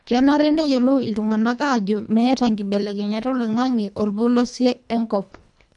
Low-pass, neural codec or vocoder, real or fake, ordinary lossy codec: 10.8 kHz; codec, 24 kHz, 1.5 kbps, HILCodec; fake; none